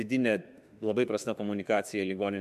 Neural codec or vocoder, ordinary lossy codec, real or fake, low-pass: autoencoder, 48 kHz, 32 numbers a frame, DAC-VAE, trained on Japanese speech; MP3, 96 kbps; fake; 14.4 kHz